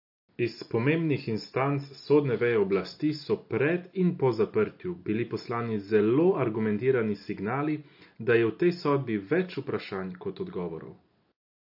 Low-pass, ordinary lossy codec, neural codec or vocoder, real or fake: 5.4 kHz; AAC, 48 kbps; none; real